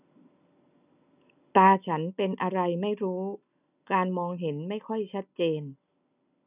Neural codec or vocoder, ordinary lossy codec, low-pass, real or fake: none; none; 3.6 kHz; real